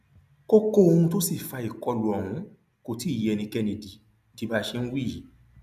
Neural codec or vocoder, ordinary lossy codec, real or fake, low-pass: none; none; real; 14.4 kHz